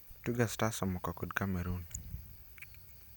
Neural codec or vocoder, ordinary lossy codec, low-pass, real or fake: none; none; none; real